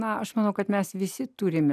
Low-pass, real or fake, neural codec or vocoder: 14.4 kHz; real; none